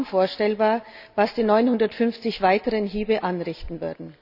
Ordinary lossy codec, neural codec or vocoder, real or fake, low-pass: none; none; real; 5.4 kHz